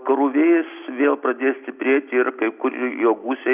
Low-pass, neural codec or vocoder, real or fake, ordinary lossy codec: 3.6 kHz; none; real; Opus, 64 kbps